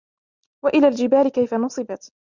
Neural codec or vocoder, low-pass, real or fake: none; 7.2 kHz; real